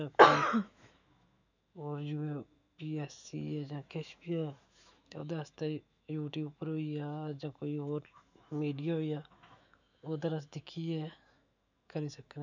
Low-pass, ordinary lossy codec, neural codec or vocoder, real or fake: 7.2 kHz; none; autoencoder, 48 kHz, 128 numbers a frame, DAC-VAE, trained on Japanese speech; fake